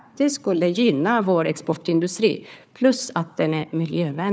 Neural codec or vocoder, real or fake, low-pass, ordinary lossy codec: codec, 16 kHz, 4 kbps, FunCodec, trained on Chinese and English, 50 frames a second; fake; none; none